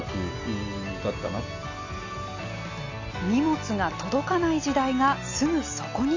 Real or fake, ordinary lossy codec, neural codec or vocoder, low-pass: real; AAC, 48 kbps; none; 7.2 kHz